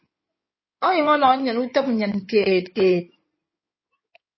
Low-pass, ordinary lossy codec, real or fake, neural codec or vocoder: 7.2 kHz; MP3, 24 kbps; fake; codec, 16 kHz in and 24 kHz out, 2.2 kbps, FireRedTTS-2 codec